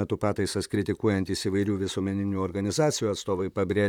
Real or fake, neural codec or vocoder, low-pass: fake; vocoder, 44.1 kHz, 128 mel bands, Pupu-Vocoder; 19.8 kHz